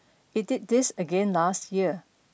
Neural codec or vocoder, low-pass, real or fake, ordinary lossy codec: none; none; real; none